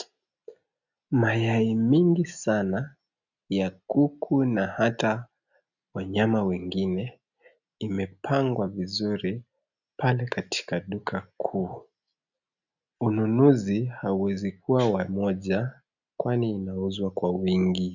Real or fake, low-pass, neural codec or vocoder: real; 7.2 kHz; none